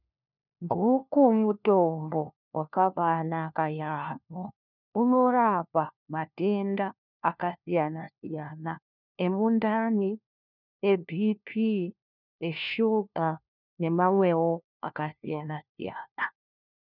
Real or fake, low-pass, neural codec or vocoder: fake; 5.4 kHz; codec, 16 kHz, 1 kbps, FunCodec, trained on LibriTTS, 50 frames a second